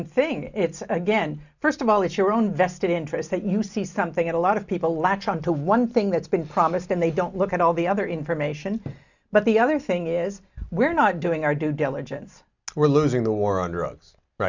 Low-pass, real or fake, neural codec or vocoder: 7.2 kHz; real; none